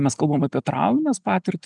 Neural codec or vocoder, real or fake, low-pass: none; real; 10.8 kHz